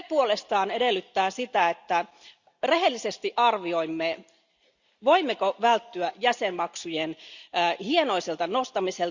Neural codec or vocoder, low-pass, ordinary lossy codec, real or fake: none; 7.2 kHz; Opus, 64 kbps; real